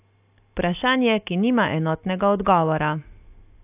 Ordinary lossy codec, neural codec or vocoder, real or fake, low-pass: AAC, 32 kbps; none; real; 3.6 kHz